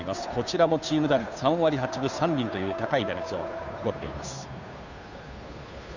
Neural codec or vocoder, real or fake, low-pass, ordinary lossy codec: codec, 16 kHz, 2 kbps, FunCodec, trained on Chinese and English, 25 frames a second; fake; 7.2 kHz; none